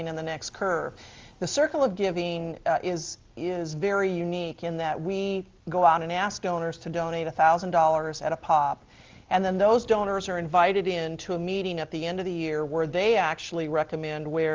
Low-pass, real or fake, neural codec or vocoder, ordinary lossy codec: 7.2 kHz; real; none; Opus, 32 kbps